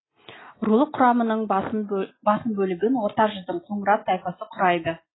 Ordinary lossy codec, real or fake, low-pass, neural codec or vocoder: AAC, 16 kbps; real; 7.2 kHz; none